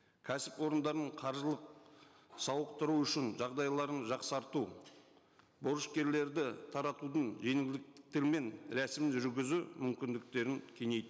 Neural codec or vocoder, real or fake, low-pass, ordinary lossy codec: none; real; none; none